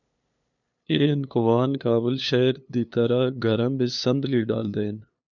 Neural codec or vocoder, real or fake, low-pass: codec, 16 kHz, 2 kbps, FunCodec, trained on LibriTTS, 25 frames a second; fake; 7.2 kHz